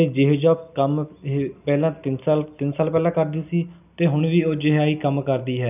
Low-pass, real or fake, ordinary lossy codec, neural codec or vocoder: 3.6 kHz; real; none; none